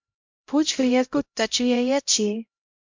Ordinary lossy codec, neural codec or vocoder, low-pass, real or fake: MP3, 64 kbps; codec, 16 kHz, 0.5 kbps, X-Codec, HuBERT features, trained on LibriSpeech; 7.2 kHz; fake